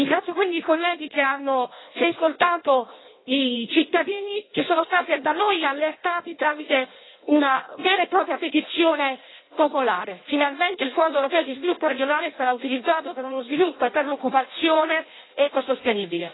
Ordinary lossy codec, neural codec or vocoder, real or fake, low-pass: AAC, 16 kbps; codec, 16 kHz in and 24 kHz out, 0.6 kbps, FireRedTTS-2 codec; fake; 7.2 kHz